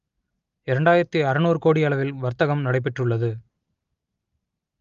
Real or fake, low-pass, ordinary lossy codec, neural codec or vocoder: real; 7.2 kHz; Opus, 32 kbps; none